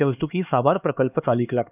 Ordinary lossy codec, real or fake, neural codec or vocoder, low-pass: none; fake; codec, 16 kHz, 2 kbps, X-Codec, HuBERT features, trained on LibriSpeech; 3.6 kHz